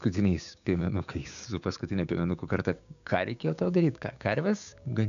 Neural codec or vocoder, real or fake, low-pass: codec, 16 kHz, 6 kbps, DAC; fake; 7.2 kHz